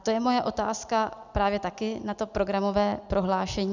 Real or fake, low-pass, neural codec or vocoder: real; 7.2 kHz; none